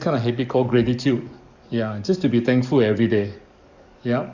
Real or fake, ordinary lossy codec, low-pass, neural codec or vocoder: fake; Opus, 64 kbps; 7.2 kHz; codec, 44.1 kHz, 7.8 kbps, DAC